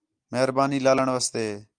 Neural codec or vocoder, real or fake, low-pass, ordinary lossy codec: none; real; 9.9 kHz; Opus, 32 kbps